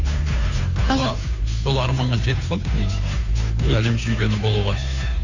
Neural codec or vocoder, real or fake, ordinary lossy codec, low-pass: codec, 16 kHz, 2 kbps, FunCodec, trained on Chinese and English, 25 frames a second; fake; none; 7.2 kHz